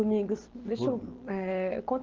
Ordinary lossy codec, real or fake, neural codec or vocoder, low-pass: Opus, 16 kbps; real; none; 7.2 kHz